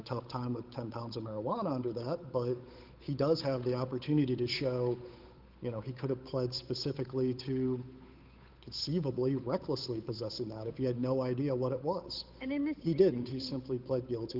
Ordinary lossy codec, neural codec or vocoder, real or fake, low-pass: Opus, 24 kbps; codec, 16 kHz, 8 kbps, FunCodec, trained on Chinese and English, 25 frames a second; fake; 5.4 kHz